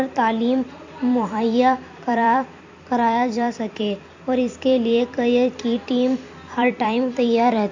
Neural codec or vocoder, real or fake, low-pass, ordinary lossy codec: vocoder, 44.1 kHz, 128 mel bands every 256 samples, BigVGAN v2; fake; 7.2 kHz; AAC, 48 kbps